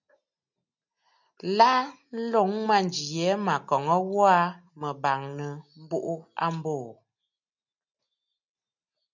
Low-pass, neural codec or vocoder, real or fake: 7.2 kHz; none; real